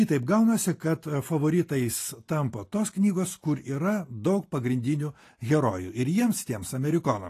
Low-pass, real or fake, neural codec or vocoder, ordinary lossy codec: 14.4 kHz; real; none; AAC, 48 kbps